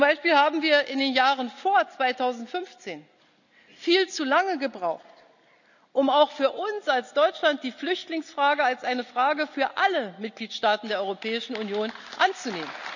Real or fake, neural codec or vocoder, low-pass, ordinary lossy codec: real; none; 7.2 kHz; none